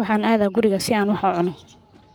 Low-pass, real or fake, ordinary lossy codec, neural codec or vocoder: none; fake; none; codec, 44.1 kHz, 7.8 kbps, Pupu-Codec